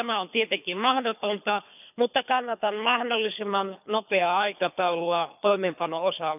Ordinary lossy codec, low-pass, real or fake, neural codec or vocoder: none; 3.6 kHz; fake; codec, 24 kHz, 3 kbps, HILCodec